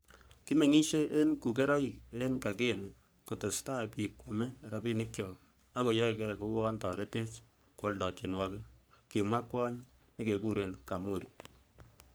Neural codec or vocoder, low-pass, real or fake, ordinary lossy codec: codec, 44.1 kHz, 3.4 kbps, Pupu-Codec; none; fake; none